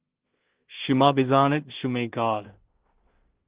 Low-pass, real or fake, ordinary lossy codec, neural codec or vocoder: 3.6 kHz; fake; Opus, 16 kbps; codec, 16 kHz in and 24 kHz out, 0.4 kbps, LongCat-Audio-Codec, two codebook decoder